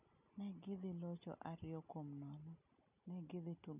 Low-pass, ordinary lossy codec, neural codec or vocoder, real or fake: 3.6 kHz; none; none; real